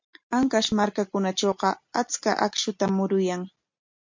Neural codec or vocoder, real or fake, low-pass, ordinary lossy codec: none; real; 7.2 kHz; MP3, 48 kbps